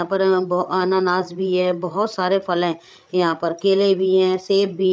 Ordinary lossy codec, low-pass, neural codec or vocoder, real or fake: none; none; codec, 16 kHz, 8 kbps, FreqCodec, larger model; fake